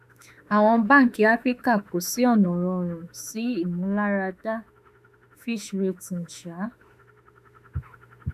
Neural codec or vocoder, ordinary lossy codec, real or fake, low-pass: autoencoder, 48 kHz, 32 numbers a frame, DAC-VAE, trained on Japanese speech; none; fake; 14.4 kHz